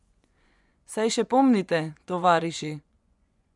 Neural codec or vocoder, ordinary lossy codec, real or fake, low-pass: vocoder, 24 kHz, 100 mel bands, Vocos; MP3, 96 kbps; fake; 10.8 kHz